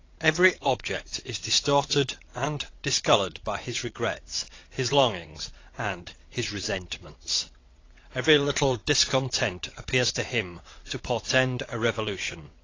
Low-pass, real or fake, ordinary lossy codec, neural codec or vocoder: 7.2 kHz; fake; AAC, 32 kbps; vocoder, 22.05 kHz, 80 mel bands, WaveNeXt